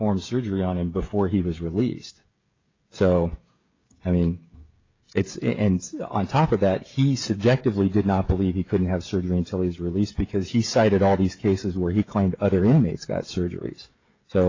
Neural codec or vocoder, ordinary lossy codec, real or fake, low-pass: codec, 16 kHz, 16 kbps, FreqCodec, smaller model; AAC, 32 kbps; fake; 7.2 kHz